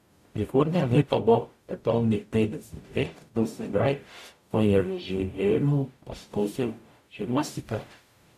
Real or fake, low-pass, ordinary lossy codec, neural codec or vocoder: fake; 14.4 kHz; none; codec, 44.1 kHz, 0.9 kbps, DAC